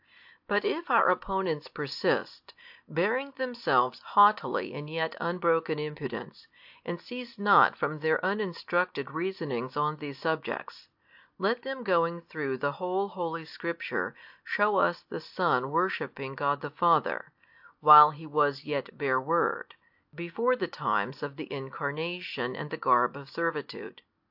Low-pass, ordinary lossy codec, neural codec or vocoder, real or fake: 5.4 kHz; AAC, 48 kbps; none; real